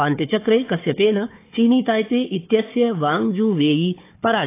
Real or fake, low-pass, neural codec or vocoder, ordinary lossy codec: fake; 3.6 kHz; codec, 16 kHz, 4 kbps, FunCodec, trained on Chinese and English, 50 frames a second; AAC, 24 kbps